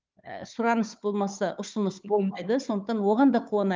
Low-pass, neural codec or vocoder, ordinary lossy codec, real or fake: 7.2 kHz; codec, 16 kHz, 4 kbps, FunCodec, trained on Chinese and English, 50 frames a second; Opus, 32 kbps; fake